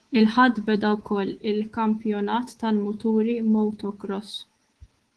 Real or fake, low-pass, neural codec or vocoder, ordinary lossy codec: fake; 10.8 kHz; codec, 24 kHz, 3.1 kbps, DualCodec; Opus, 16 kbps